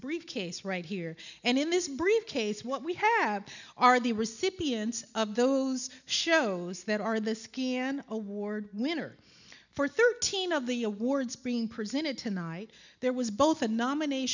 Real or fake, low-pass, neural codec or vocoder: real; 7.2 kHz; none